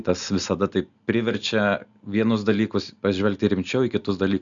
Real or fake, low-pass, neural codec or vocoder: real; 7.2 kHz; none